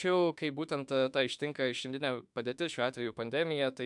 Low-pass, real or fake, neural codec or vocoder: 10.8 kHz; fake; autoencoder, 48 kHz, 32 numbers a frame, DAC-VAE, trained on Japanese speech